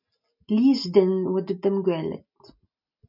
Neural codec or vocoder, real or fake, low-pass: none; real; 5.4 kHz